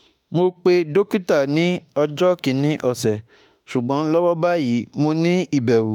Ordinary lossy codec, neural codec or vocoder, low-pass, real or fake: none; autoencoder, 48 kHz, 32 numbers a frame, DAC-VAE, trained on Japanese speech; 19.8 kHz; fake